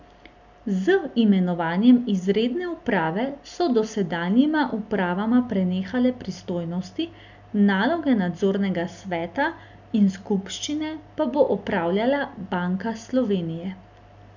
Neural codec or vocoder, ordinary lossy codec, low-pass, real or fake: none; none; 7.2 kHz; real